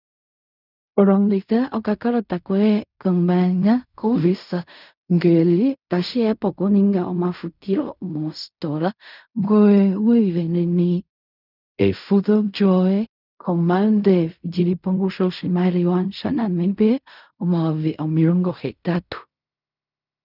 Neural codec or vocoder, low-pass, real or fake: codec, 16 kHz in and 24 kHz out, 0.4 kbps, LongCat-Audio-Codec, fine tuned four codebook decoder; 5.4 kHz; fake